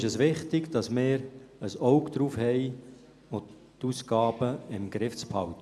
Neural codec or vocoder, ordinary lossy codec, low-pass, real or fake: none; none; none; real